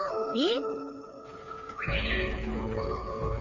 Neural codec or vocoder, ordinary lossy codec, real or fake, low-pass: codec, 16 kHz, 4 kbps, FreqCodec, smaller model; none; fake; 7.2 kHz